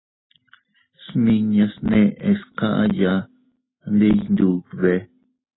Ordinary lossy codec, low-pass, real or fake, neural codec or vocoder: AAC, 16 kbps; 7.2 kHz; real; none